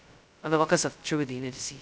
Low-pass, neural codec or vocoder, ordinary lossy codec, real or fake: none; codec, 16 kHz, 0.2 kbps, FocalCodec; none; fake